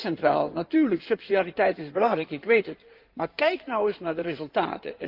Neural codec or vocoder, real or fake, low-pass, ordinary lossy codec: codec, 16 kHz in and 24 kHz out, 2.2 kbps, FireRedTTS-2 codec; fake; 5.4 kHz; Opus, 24 kbps